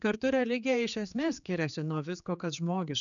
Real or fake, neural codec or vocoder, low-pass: fake; codec, 16 kHz, 4 kbps, X-Codec, HuBERT features, trained on general audio; 7.2 kHz